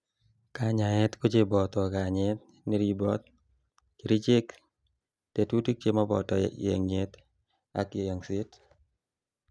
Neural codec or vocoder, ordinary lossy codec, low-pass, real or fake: none; none; none; real